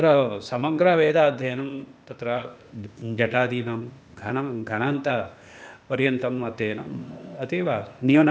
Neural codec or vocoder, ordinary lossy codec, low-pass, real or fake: codec, 16 kHz, 0.8 kbps, ZipCodec; none; none; fake